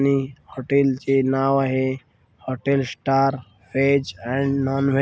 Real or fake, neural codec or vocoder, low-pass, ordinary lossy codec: real; none; none; none